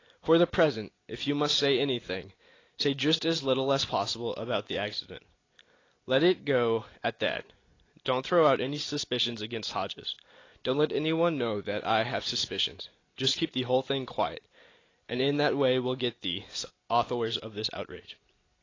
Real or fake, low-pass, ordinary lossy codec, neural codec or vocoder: real; 7.2 kHz; AAC, 32 kbps; none